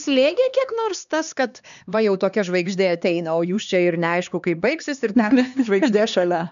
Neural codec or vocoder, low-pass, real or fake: codec, 16 kHz, 2 kbps, X-Codec, HuBERT features, trained on LibriSpeech; 7.2 kHz; fake